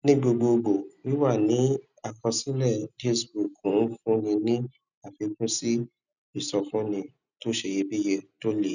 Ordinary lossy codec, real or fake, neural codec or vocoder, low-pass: none; real; none; 7.2 kHz